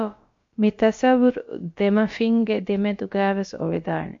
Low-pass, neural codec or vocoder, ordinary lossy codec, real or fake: 7.2 kHz; codec, 16 kHz, about 1 kbps, DyCAST, with the encoder's durations; MP3, 48 kbps; fake